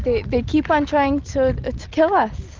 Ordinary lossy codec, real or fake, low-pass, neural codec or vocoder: Opus, 24 kbps; real; 7.2 kHz; none